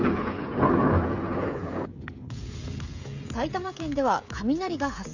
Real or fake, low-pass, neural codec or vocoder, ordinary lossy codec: fake; 7.2 kHz; codec, 16 kHz, 16 kbps, FreqCodec, smaller model; none